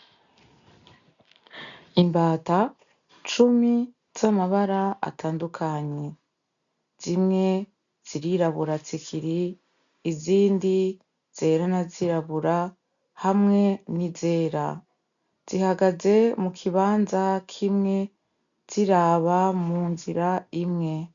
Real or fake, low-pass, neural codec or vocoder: real; 7.2 kHz; none